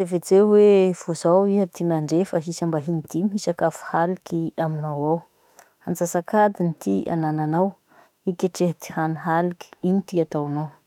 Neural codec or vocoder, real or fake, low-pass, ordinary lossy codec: autoencoder, 48 kHz, 32 numbers a frame, DAC-VAE, trained on Japanese speech; fake; 19.8 kHz; none